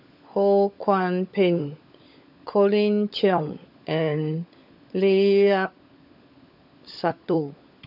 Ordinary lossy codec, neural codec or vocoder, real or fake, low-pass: AAC, 48 kbps; codec, 16 kHz, 16 kbps, FunCodec, trained on LibriTTS, 50 frames a second; fake; 5.4 kHz